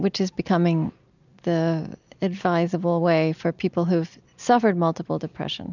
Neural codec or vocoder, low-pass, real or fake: none; 7.2 kHz; real